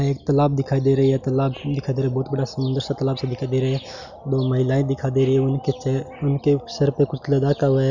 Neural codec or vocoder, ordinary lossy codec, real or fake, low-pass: none; none; real; 7.2 kHz